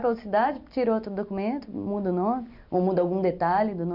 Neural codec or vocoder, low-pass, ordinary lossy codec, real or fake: none; 5.4 kHz; none; real